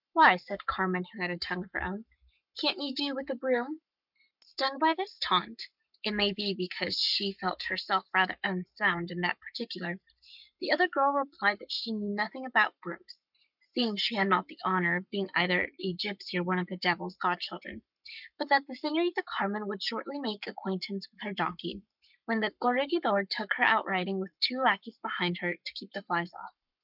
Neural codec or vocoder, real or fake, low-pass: codec, 44.1 kHz, 7.8 kbps, Pupu-Codec; fake; 5.4 kHz